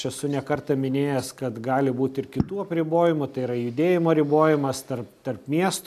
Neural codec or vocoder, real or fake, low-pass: none; real; 14.4 kHz